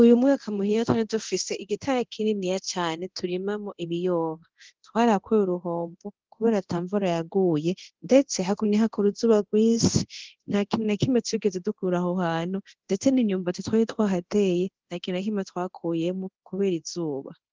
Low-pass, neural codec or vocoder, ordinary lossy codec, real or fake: 7.2 kHz; codec, 24 kHz, 0.9 kbps, DualCodec; Opus, 16 kbps; fake